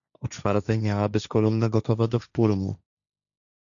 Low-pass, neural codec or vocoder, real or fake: 7.2 kHz; codec, 16 kHz, 1.1 kbps, Voila-Tokenizer; fake